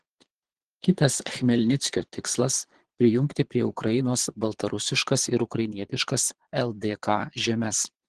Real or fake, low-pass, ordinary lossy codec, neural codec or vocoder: fake; 9.9 kHz; Opus, 16 kbps; vocoder, 22.05 kHz, 80 mel bands, Vocos